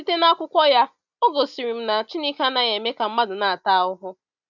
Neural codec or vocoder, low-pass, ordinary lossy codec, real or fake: none; 7.2 kHz; none; real